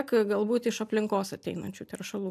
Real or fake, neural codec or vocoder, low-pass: real; none; 14.4 kHz